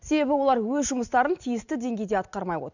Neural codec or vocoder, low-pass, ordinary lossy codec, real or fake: none; 7.2 kHz; none; real